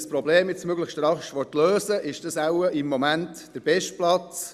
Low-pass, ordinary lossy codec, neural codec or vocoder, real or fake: 14.4 kHz; Opus, 64 kbps; none; real